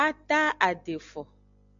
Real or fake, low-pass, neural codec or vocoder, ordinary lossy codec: real; 7.2 kHz; none; MP3, 96 kbps